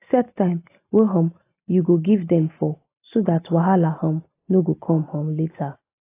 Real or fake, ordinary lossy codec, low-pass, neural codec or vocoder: real; AAC, 24 kbps; 3.6 kHz; none